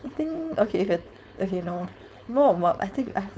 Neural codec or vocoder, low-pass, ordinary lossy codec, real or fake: codec, 16 kHz, 4.8 kbps, FACodec; none; none; fake